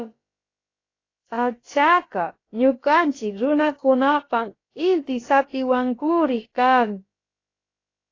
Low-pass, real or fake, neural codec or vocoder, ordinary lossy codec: 7.2 kHz; fake; codec, 16 kHz, about 1 kbps, DyCAST, with the encoder's durations; AAC, 32 kbps